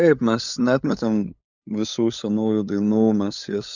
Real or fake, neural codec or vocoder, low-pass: fake; codec, 16 kHz, 8 kbps, FunCodec, trained on LibriTTS, 25 frames a second; 7.2 kHz